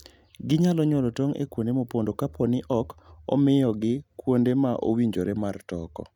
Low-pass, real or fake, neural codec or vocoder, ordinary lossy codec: 19.8 kHz; real; none; none